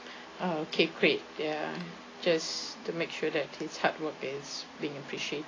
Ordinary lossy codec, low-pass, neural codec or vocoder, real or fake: AAC, 32 kbps; 7.2 kHz; none; real